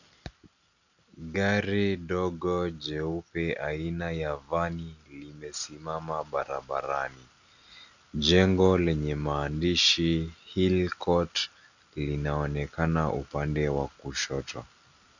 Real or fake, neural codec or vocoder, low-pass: real; none; 7.2 kHz